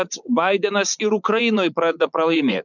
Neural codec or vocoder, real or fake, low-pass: vocoder, 44.1 kHz, 80 mel bands, Vocos; fake; 7.2 kHz